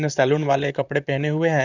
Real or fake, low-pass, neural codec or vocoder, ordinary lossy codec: fake; 7.2 kHz; vocoder, 44.1 kHz, 128 mel bands, Pupu-Vocoder; none